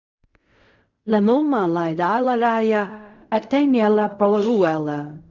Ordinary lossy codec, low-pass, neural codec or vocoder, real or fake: Opus, 64 kbps; 7.2 kHz; codec, 16 kHz in and 24 kHz out, 0.4 kbps, LongCat-Audio-Codec, fine tuned four codebook decoder; fake